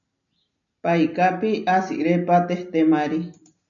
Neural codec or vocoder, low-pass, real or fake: none; 7.2 kHz; real